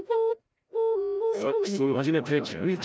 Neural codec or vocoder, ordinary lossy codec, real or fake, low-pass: codec, 16 kHz, 0.5 kbps, FreqCodec, larger model; none; fake; none